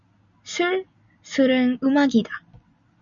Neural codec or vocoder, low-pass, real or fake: none; 7.2 kHz; real